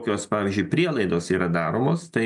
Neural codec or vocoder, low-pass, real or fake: none; 10.8 kHz; real